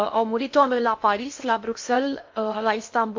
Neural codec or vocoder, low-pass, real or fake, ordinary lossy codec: codec, 16 kHz in and 24 kHz out, 0.8 kbps, FocalCodec, streaming, 65536 codes; 7.2 kHz; fake; MP3, 48 kbps